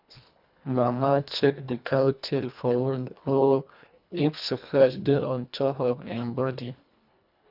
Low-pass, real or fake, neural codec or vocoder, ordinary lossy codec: 5.4 kHz; fake; codec, 24 kHz, 1.5 kbps, HILCodec; none